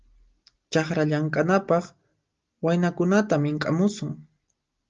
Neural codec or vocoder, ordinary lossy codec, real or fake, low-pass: none; Opus, 32 kbps; real; 7.2 kHz